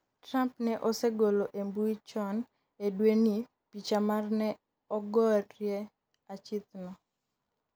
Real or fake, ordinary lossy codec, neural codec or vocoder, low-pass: real; none; none; none